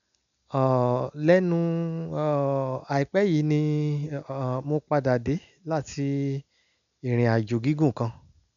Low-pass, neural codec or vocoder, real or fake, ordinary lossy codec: 7.2 kHz; none; real; none